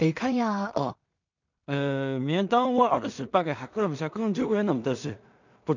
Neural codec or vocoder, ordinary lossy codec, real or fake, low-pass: codec, 16 kHz in and 24 kHz out, 0.4 kbps, LongCat-Audio-Codec, two codebook decoder; none; fake; 7.2 kHz